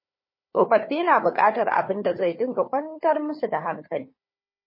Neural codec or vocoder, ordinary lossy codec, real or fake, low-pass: codec, 16 kHz, 4 kbps, FunCodec, trained on Chinese and English, 50 frames a second; MP3, 24 kbps; fake; 5.4 kHz